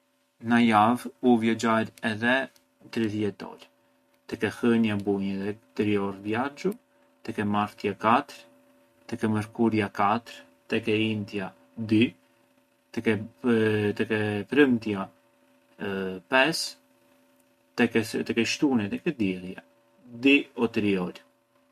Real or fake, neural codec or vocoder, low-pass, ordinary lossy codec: real; none; 14.4 kHz; MP3, 64 kbps